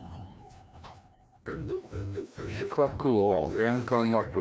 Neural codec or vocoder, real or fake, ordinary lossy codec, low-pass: codec, 16 kHz, 1 kbps, FreqCodec, larger model; fake; none; none